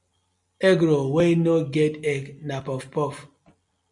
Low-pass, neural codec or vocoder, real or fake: 10.8 kHz; none; real